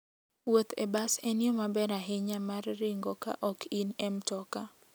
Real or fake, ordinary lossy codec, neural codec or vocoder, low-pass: real; none; none; none